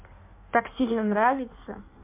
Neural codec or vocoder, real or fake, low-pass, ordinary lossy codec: codec, 16 kHz in and 24 kHz out, 1.1 kbps, FireRedTTS-2 codec; fake; 3.6 kHz; MP3, 24 kbps